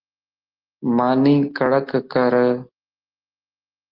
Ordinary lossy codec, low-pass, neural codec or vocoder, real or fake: Opus, 16 kbps; 5.4 kHz; none; real